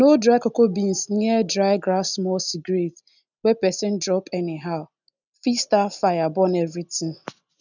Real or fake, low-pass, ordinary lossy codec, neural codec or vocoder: real; 7.2 kHz; none; none